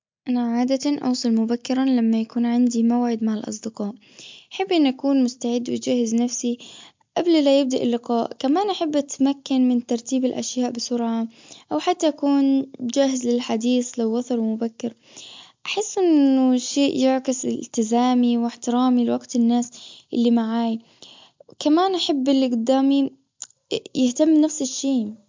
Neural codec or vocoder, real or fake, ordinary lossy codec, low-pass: none; real; MP3, 64 kbps; 7.2 kHz